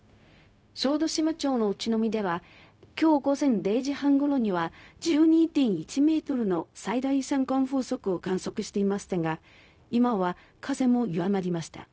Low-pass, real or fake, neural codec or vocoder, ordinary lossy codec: none; fake; codec, 16 kHz, 0.4 kbps, LongCat-Audio-Codec; none